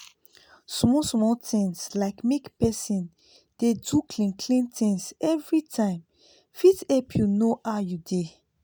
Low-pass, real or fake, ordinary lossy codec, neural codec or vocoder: none; real; none; none